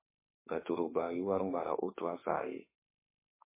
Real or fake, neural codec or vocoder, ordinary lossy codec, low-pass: fake; autoencoder, 48 kHz, 32 numbers a frame, DAC-VAE, trained on Japanese speech; MP3, 16 kbps; 3.6 kHz